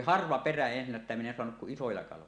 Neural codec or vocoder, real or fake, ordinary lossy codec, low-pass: none; real; none; 9.9 kHz